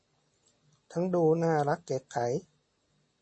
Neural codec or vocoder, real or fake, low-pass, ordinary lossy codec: none; real; 9.9 kHz; MP3, 32 kbps